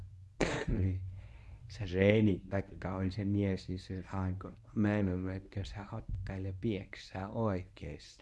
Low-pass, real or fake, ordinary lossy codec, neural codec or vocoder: none; fake; none; codec, 24 kHz, 0.9 kbps, WavTokenizer, medium speech release version 1